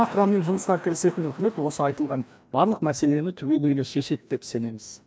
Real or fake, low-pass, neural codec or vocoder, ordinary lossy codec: fake; none; codec, 16 kHz, 1 kbps, FreqCodec, larger model; none